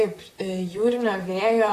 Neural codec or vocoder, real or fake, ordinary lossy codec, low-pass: vocoder, 44.1 kHz, 128 mel bands, Pupu-Vocoder; fake; AAC, 48 kbps; 14.4 kHz